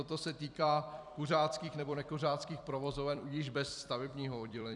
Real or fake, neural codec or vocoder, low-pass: fake; vocoder, 44.1 kHz, 128 mel bands every 256 samples, BigVGAN v2; 10.8 kHz